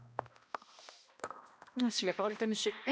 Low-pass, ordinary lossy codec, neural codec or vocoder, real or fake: none; none; codec, 16 kHz, 1 kbps, X-Codec, HuBERT features, trained on balanced general audio; fake